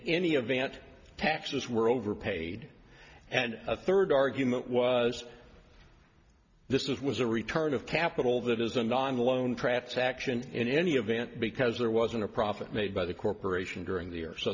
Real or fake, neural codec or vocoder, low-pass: real; none; 7.2 kHz